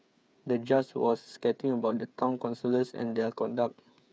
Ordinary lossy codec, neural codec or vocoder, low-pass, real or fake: none; codec, 16 kHz, 8 kbps, FreqCodec, smaller model; none; fake